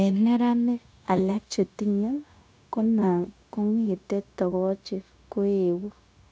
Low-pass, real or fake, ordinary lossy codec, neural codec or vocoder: none; fake; none; codec, 16 kHz, 0.9 kbps, LongCat-Audio-Codec